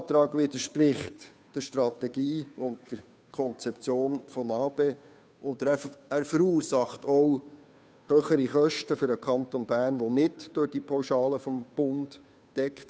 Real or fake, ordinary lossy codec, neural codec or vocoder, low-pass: fake; none; codec, 16 kHz, 2 kbps, FunCodec, trained on Chinese and English, 25 frames a second; none